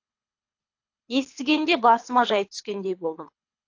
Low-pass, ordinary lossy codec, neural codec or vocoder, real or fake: 7.2 kHz; AAC, 48 kbps; codec, 24 kHz, 3 kbps, HILCodec; fake